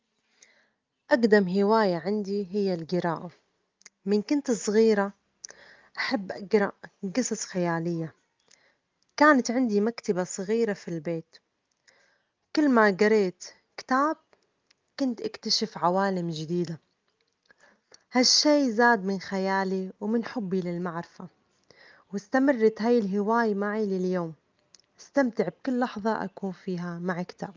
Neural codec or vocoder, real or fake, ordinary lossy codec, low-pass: none; real; Opus, 24 kbps; 7.2 kHz